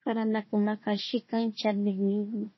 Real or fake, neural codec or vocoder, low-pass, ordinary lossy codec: fake; codec, 16 kHz, 1 kbps, FunCodec, trained on Chinese and English, 50 frames a second; 7.2 kHz; MP3, 24 kbps